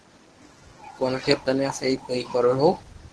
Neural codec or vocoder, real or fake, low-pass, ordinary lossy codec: codec, 24 kHz, 0.9 kbps, WavTokenizer, medium speech release version 1; fake; 10.8 kHz; Opus, 16 kbps